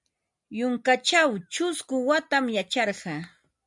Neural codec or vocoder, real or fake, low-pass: none; real; 10.8 kHz